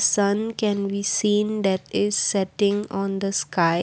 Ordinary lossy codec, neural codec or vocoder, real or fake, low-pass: none; none; real; none